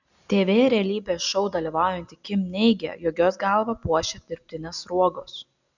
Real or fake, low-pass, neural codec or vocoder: real; 7.2 kHz; none